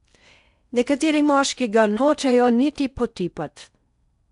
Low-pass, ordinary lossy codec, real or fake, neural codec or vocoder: 10.8 kHz; none; fake; codec, 16 kHz in and 24 kHz out, 0.6 kbps, FocalCodec, streaming, 4096 codes